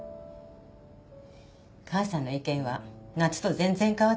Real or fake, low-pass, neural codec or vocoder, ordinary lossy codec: real; none; none; none